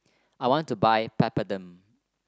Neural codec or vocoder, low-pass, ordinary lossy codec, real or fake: none; none; none; real